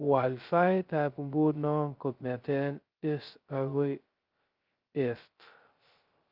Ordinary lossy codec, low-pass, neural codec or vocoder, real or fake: Opus, 32 kbps; 5.4 kHz; codec, 16 kHz, 0.2 kbps, FocalCodec; fake